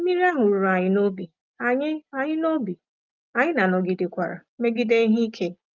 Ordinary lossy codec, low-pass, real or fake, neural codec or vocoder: Opus, 24 kbps; 7.2 kHz; real; none